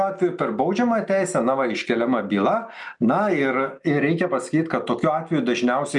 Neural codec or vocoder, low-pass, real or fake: none; 10.8 kHz; real